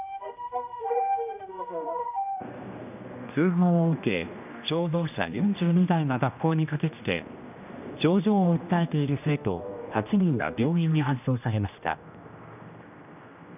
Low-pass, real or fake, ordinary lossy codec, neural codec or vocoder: 3.6 kHz; fake; none; codec, 16 kHz, 1 kbps, X-Codec, HuBERT features, trained on general audio